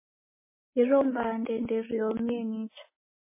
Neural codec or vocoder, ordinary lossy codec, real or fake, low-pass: none; MP3, 16 kbps; real; 3.6 kHz